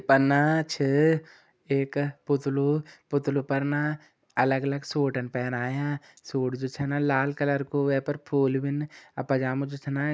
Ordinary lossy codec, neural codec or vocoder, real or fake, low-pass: none; none; real; none